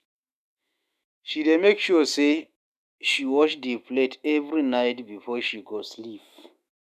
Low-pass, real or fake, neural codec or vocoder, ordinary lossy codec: 14.4 kHz; fake; autoencoder, 48 kHz, 128 numbers a frame, DAC-VAE, trained on Japanese speech; none